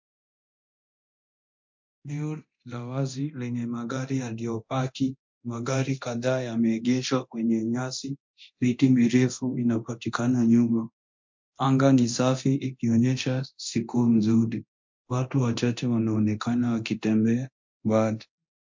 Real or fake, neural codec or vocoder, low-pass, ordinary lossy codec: fake; codec, 24 kHz, 0.9 kbps, DualCodec; 7.2 kHz; MP3, 48 kbps